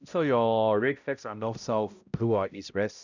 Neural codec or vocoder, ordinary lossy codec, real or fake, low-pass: codec, 16 kHz, 0.5 kbps, X-Codec, HuBERT features, trained on balanced general audio; none; fake; 7.2 kHz